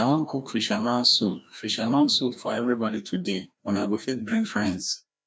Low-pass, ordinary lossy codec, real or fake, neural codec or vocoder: none; none; fake; codec, 16 kHz, 1 kbps, FreqCodec, larger model